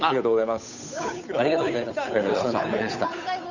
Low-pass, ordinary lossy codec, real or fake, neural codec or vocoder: 7.2 kHz; none; fake; codec, 16 kHz, 8 kbps, FunCodec, trained on Chinese and English, 25 frames a second